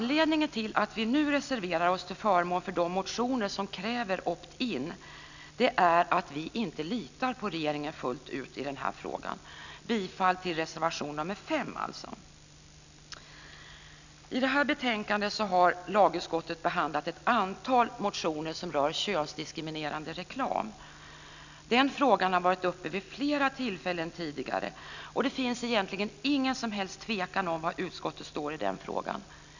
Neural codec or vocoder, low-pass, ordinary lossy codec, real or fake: none; 7.2 kHz; none; real